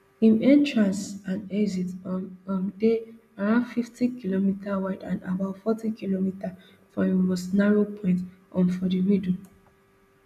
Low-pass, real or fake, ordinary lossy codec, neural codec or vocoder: 14.4 kHz; real; none; none